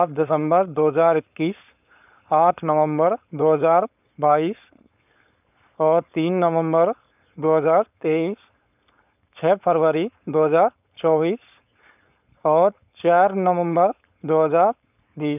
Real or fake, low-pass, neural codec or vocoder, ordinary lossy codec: fake; 3.6 kHz; codec, 16 kHz, 4.8 kbps, FACodec; none